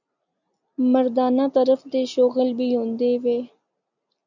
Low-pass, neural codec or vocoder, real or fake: 7.2 kHz; none; real